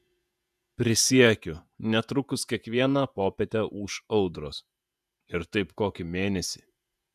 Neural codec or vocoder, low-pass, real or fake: vocoder, 48 kHz, 128 mel bands, Vocos; 14.4 kHz; fake